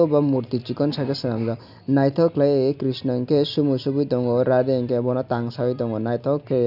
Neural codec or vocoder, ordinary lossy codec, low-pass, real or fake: none; none; 5.4 kHz; real